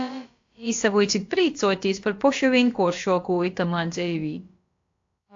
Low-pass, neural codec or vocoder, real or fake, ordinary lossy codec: 7.2 kHz; codec, 16 kHz, about 1 kbps, DyCAST, with the encoder's durations; fake; AAC, 48 kbps